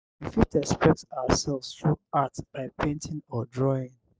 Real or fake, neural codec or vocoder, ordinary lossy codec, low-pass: real; none; none; none